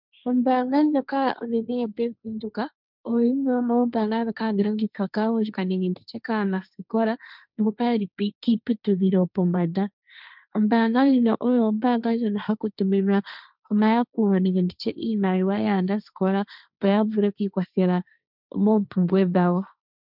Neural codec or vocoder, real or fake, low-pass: codec, 16 kHz, 1.1 kbps, Voila-Tokenizer; fake; 5.4 kHz